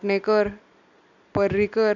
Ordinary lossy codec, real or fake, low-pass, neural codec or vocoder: none; real; 7.2 kHz; none